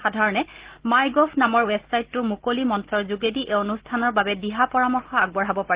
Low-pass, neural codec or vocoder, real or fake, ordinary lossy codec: 3.6 kHz; none; real; Opus, 16 kbps